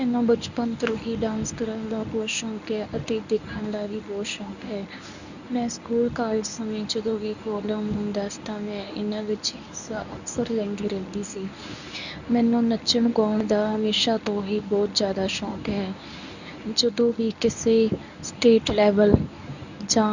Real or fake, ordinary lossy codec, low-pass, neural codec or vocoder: fake; none; 7.2 kHz; codec, 24 kHz, 0.9 kbps, WavTokenizer, medium speech release version 1